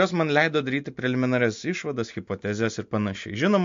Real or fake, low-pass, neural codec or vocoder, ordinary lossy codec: real; 7.2 kHz; none; MP3, 48 kbps